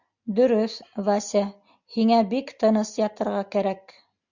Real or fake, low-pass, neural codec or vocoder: real; 7.2 kHz; none